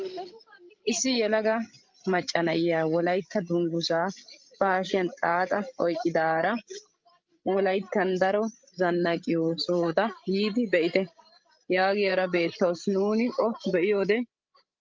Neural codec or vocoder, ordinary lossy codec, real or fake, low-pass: codec, 16 kHz, 16 kbps, FreqCodec, larger model; Opus, 32 kbps; fake; 7.2 kHz